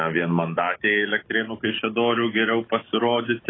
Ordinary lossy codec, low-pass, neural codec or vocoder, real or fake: AAC, 16 kbps; 7.2 kHz; autoencoder, 48 kHz, 128 numbers a frame, DAC-VAE, trained on Japanese speech; fake